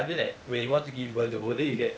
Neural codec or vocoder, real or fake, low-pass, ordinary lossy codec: codec, 16 kHz, 0.8 kbps, ZipCodec; fake; none; none